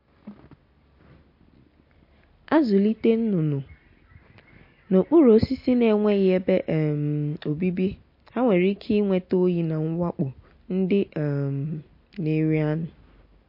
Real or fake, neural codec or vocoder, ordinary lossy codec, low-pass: real; none; MP3, 32 kbps; 5.4 kHz